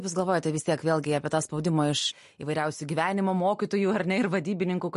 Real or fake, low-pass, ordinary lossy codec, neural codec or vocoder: real; 14.4 kHz; MP3, 48 kbps; none